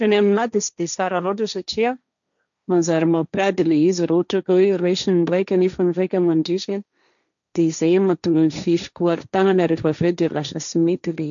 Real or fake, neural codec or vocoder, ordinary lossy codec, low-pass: fake; codec, 16 kHz, 1.1 kbps, Voila-Tokenizer; none; 7.2 kHz